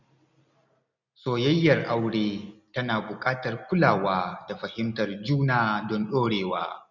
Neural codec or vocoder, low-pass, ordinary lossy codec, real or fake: none; 7.2 kHz; none; real